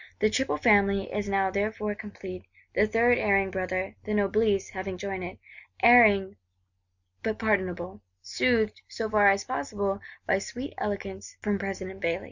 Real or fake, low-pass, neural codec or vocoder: real; 7.2 kHz; none